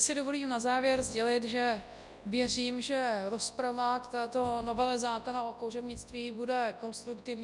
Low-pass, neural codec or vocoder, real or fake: 10.8 kHz; codec, 24 kHz, 0.9 kbps, WavTokenizer, large speech release; fake